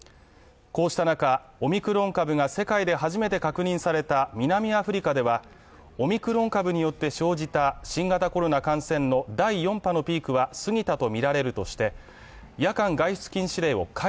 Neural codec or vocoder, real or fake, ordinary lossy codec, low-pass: none; real; none; none